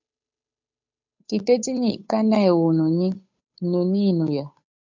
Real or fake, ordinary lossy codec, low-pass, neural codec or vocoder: fake; MP3, 64 kbps; 7.2 kHz; codec, 16 kHz, 8 kbps, FunCodec, trained on Chinese and English, 25 frames a second